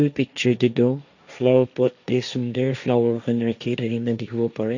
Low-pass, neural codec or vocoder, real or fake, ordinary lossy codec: 7.2 kHz; codec, 16 kHz, 1.1 kbps, Voila-Tokenizer; fake; none